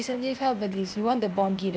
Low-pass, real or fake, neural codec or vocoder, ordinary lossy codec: none; fake; codec, 16 kHz, 0.8 kbps, ZipCodec; none